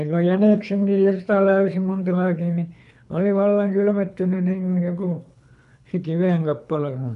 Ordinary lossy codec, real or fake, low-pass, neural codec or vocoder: none; fake; 10.8 kHz; codec, 24 kHz, 3 kbps, HILCodec